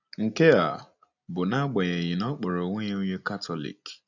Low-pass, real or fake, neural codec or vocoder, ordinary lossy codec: 7.2 kHz; real; none; none